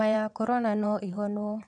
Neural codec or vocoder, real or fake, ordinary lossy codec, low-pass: vocoder, 22.05 kHz, 80 mel bands, WaveNeXt; fake; none; 9.9 kHz